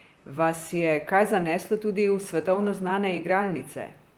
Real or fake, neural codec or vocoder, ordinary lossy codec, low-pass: fake; vocoder, 44.1 kHz, 128 mel bands, Pupu-Vocoder; Opus, 32 kbps; 19.8 kHz